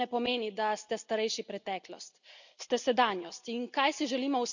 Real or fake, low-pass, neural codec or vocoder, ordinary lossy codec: real; 7.2 kHz; none; none